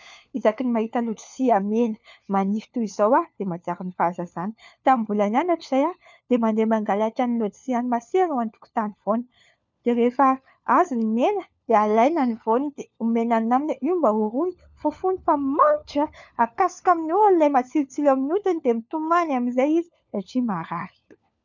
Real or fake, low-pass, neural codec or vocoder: fake; 7.2 kHz; codec, 16 kHz, 4 kbps, FunCodec, trained on LibriTTS, 50 frames a second